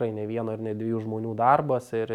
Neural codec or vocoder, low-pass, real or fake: none; 19.8 kHz; real